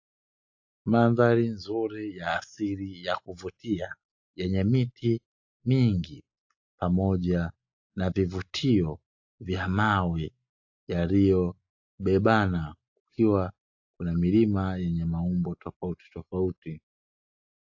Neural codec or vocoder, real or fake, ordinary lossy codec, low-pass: none; real; AAC, 48 kbps; 7.2 kHz